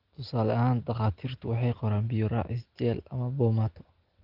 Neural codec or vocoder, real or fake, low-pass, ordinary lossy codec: none; real; 5.4 kHz; Opus, 16 kbps